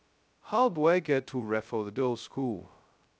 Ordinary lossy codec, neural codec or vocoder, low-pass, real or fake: none; codec, 16 kHz, 0.2 kbps, FocalCodec; none; fake